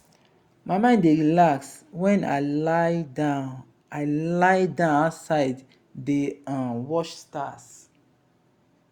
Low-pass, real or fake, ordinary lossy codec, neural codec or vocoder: 19.8 kHz; real; Opus, 64 kbps; none